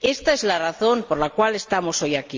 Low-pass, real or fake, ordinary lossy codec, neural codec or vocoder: 7.2 kHz; real; Opus, 32 kbps; none